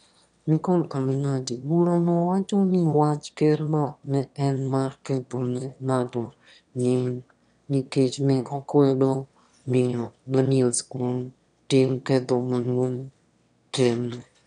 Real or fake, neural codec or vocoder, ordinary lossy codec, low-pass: fake; autoencoder, 22.05 kHz, a latent of 192 numbers a frame, VITS, trained on one speaker; none; 9.9 kHz